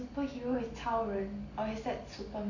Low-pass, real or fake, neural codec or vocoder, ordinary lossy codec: 7.2 kHz; real; none; AAC, 32 kbps